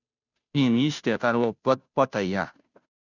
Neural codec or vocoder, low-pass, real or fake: codec, 16 kHz, 0.5 kbps, FunCodec, trained on Chinese and English, 25 frames a second; 7.2 kHz; fake